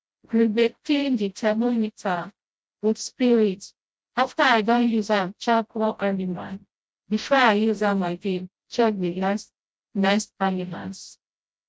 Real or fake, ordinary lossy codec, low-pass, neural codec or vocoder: fake; none; none; codec, 16 kHz, 0.5 kbps, FreqCodec, smaller model